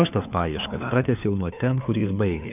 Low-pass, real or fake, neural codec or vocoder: 3.6 kHz; fake; codec, 16 kHz, 4 kbps, FunCodec, trained on LibriTTS, 50 frames a second